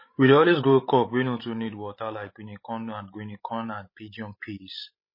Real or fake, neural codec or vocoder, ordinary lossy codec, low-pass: fake; codec, 16 kHz, 16 kbps, FreqCodec, larger model; MP3, 24 kbps; 5.4 kHz